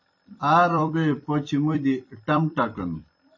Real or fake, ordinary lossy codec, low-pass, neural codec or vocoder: fake; MP3, 32 kbps; 7.2 kHz; vocoder, 44.1 kHz, 128 mel bands every 256 samples, BigVGAN v2